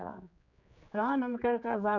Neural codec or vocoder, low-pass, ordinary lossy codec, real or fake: codec, 16 kHz, 4 kbps, X-Codec, HuBERT features, trained on general audio; 7.2 kHz; none; fake